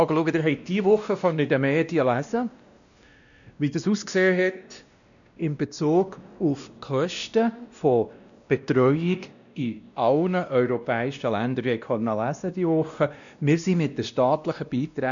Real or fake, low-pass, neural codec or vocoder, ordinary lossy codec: fake; 7.2 kHz; codec, 16 kHz, 1 kbps, X-Codec, WavLM features, trained on Multilingual LibriSpeech; none